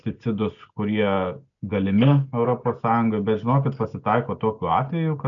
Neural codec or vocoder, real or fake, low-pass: none; real; 7.2 kHz